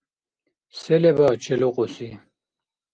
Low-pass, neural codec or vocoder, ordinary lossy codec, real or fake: 9.9 kHz; none; Opus, 24 kbps; real